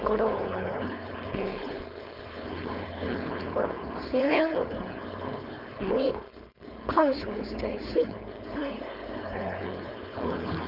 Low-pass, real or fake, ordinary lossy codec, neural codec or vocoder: 5.4 kHz; fake; none; codec, 16 kHz, 4.8 kbps, FACodec